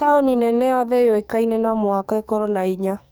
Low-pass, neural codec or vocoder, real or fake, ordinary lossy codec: none; codec, 44.1 kHz, 2.6 kbps, SNAC; fake; none